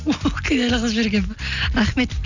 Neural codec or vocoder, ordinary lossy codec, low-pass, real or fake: none; none; 7.2 kHz; real